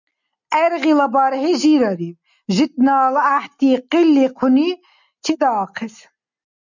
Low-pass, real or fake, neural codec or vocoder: 7.2 kHz; real; none